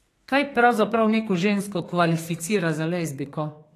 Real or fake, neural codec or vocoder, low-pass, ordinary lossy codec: fake; codec, 32 kHz, 1.9 kbps, SNAC; 14.4 kHz; AAC, 48 kbps